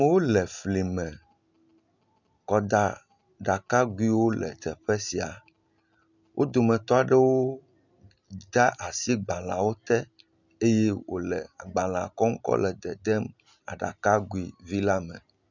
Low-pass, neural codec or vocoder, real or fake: 7.2 kHz; none; real